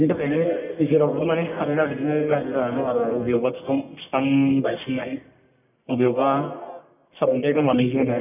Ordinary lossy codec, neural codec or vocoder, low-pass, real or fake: none; codec, 44.1 kHz, 1.7 kbps, Pupu-Codec; 3.6 kHz; fake